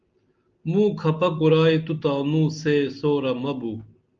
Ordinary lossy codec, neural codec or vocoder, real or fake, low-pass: Opus, 24 kbps; none; real; 7.2 kHz